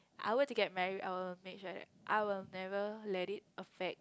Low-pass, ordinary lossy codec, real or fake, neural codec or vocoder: none; none; real; none